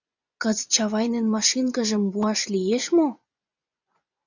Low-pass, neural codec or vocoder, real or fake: 7.2 kHz; vocoder, 24 kHz, 100 mel bands, Vocos; fake